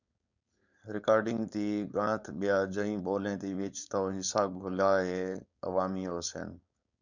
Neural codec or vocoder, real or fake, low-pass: codec, 16 kHz, 4.8 kbps, FACodec; fake; 7.2 kHz